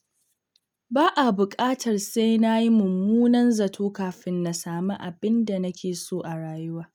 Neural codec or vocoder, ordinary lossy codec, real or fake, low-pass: none; none; real; 19.8 kHz